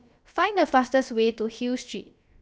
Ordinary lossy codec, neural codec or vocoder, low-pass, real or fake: none; codec, 16 kHz, about 1 kbps, DyCAST, with the encoder's durations; none; fake